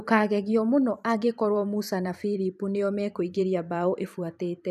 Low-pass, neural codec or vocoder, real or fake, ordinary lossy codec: 14.4 kHz; vocoder, 44.1 kHz, 128 mel bands every 512 samples, BigVGAN v2; fake; none